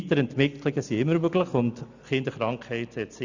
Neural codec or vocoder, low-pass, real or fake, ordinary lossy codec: none; 7.2 kHz; real; none